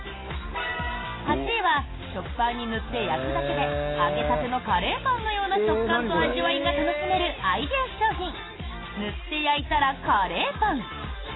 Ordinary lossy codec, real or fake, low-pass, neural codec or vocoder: AAC, 16 kbps; real; 7.2 kHz; none